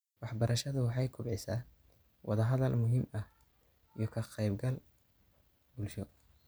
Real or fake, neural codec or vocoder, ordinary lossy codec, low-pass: real; none; none; none